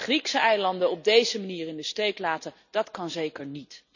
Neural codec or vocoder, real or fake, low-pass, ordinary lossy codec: none; real; 7.2 kHz; none